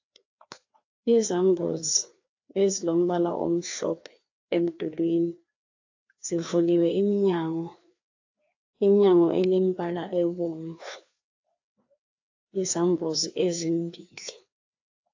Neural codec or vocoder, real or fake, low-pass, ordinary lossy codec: codec, 16 kHz, 2 kbps, FreqCodec, larger model; fake; 7.2 kHz; AAC, 48 kbps